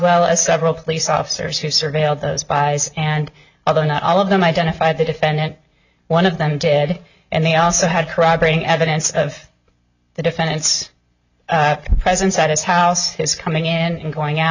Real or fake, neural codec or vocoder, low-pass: real; none; 7.2 kHz